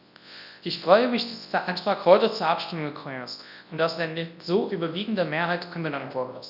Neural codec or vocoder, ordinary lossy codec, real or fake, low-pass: codec, 24 kHz, 0.9 kbps, WavTokenizer, large speech release; none; fake; 5.4 kHz